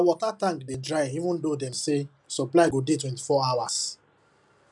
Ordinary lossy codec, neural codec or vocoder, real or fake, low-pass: none; none; real; 10.8 kHz